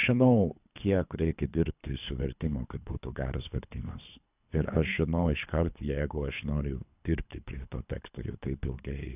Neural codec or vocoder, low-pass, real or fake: codec, 24 kHz, 3 kbps, HILCodec; 3.6 kHz; fake